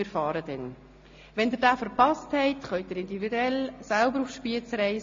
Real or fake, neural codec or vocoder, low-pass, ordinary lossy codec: real; none; 7.2 kHz; AAC, 48 kbps